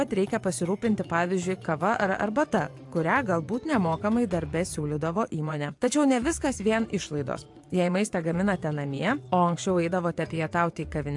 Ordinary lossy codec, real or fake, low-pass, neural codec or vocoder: AAC, 64 kbps; fake; 10.8 kHz; vocoder, 24 kHz, 100 mel bands, Vocos